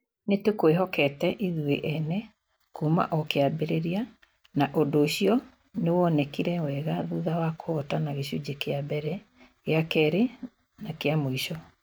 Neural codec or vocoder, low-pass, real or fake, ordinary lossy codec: none; none; real; none